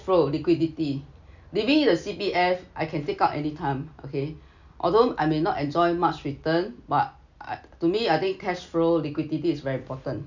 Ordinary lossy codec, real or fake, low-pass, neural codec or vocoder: none; real; 7.2 kHz; none